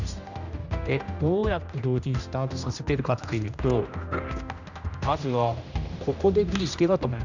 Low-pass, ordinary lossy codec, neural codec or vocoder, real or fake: 7.2 kHz; none; codec, 16 kHz, 1 kbps, X-Codec, HuBERT features, trained on general audio; fake